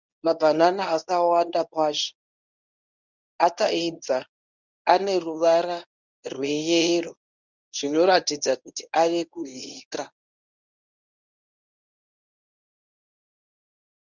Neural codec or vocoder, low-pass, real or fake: codec, 24 kHz, 0.9 kbps, WavTokenizer, medium speech release version 1; 7.2 kHz; fake